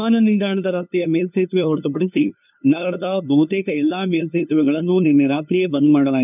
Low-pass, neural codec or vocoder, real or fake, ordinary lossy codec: 3.6 kHz; codec, 16 kHz, 2 kbps, FunCodec, trained on LibriTTS, 25 frames a second; fake; none